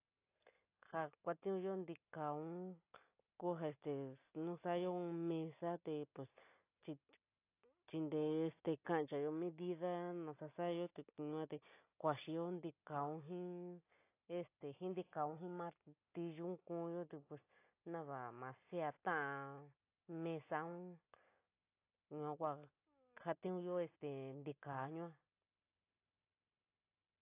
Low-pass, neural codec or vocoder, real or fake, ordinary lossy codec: 3.6 kHz; none; real; AAC, 24 kbps